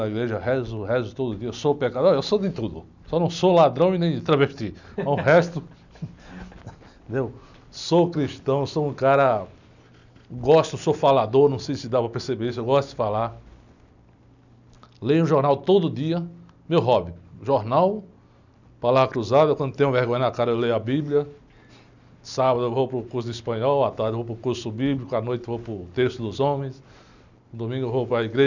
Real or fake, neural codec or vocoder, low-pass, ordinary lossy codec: real; none; 7.2 kHz; none